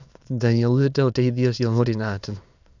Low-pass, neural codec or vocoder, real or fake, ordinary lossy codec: 7.2 kHz; autoencoder, 22.05 kHz, a latent of 192 numbers a frame, VITS, trained on many speakers; fake; none